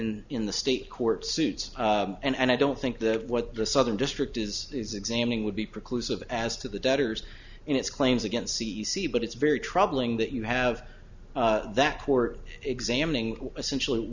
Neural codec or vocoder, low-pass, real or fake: none; 7.2 kHz; real